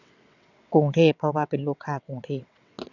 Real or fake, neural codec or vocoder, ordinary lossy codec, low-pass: fake; vocoder, 22.05 kHz, 80 mel bands, WaveNeXt; none; 7.2 kHz